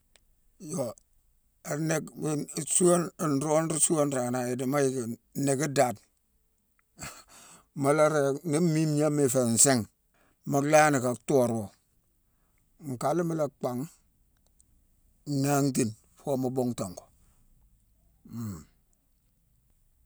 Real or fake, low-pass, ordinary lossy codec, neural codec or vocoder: real; none; none; none